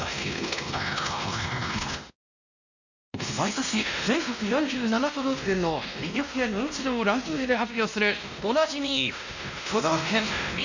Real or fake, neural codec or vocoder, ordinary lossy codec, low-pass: fake; codec, 16 kHz, 1 kbps, X-Codec, WavLM features, trained on Multilingual LibriSpeech; none; 7.2 kHz